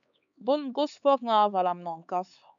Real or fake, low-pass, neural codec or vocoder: fake; 7.2 kHz; codec, 16 kHz, 4 kbps, X-Codec, HuBERT features, trained on LibriSpeech